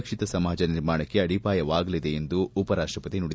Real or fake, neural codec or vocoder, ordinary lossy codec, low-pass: real; none; none; none